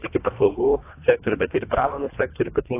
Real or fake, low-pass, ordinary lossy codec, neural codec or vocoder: fake; 3.6 kHz; AAC, 16 kbps; codec, 24 kHz, 1.5 kbps, HILCodec